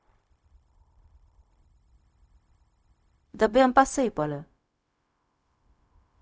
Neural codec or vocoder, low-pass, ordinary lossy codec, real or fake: codec, 16 kHz, 0.4 kbps, LongCat-Audio-Codec; none; none; fake